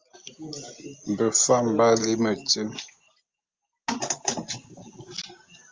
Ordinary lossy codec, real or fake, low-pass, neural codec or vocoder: Opus, 32 kbps; real; 7.2 kHz; none